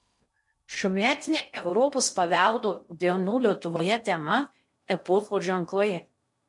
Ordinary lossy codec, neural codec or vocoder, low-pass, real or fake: MP3, 64 kbps; codec, 16 kHz in and 24 kHz out, 0.6 kbps, FocalCodec, streaming, 2048 codes; 10.8 kHz; fake